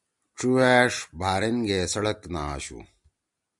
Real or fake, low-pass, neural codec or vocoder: real; 10.8 kHz; none